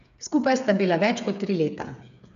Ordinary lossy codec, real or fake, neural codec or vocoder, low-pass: none; fake; codec, 16 kHz, 8 kbps, FreqCodec, smaller model; 7.2 kHz